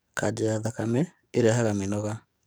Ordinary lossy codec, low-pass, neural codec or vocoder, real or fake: none; none; codec, 44.1 kHz, 7.8 kbps, DAC; fake